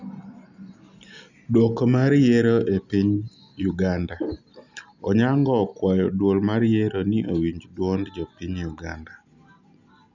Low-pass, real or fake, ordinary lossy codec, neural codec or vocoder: 7.2 kHz; real; none; none